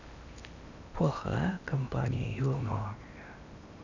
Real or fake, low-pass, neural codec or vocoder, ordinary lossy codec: fake; 7.2 kHz; codec, 16 kHz in and 24 kHz out, 0.8 kbps, FocalCodec, streaming, 65536 codes; none